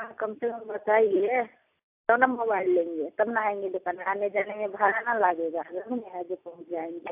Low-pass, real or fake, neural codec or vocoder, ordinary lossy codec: 3.6 kHz; real; none; AAC, 32 kbps